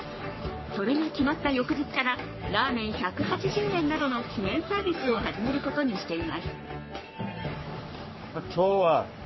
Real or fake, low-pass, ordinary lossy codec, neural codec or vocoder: fake; 7.2 kHz; MP3, 24 kbps; codec, 44.1 kHz, 3.4 kbps, Pupu-Codec